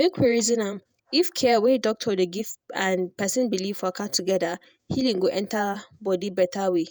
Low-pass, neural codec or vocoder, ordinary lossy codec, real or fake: none; vocoder, 48 kHz, 128 mel bands, Vocos; none; fake